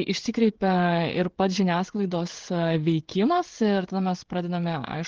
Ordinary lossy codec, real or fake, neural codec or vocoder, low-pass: Opus, 16 kbps; fake; codec, 16 kHz, 16 kbps, FreqCodec, smaller model; 7.2 kHz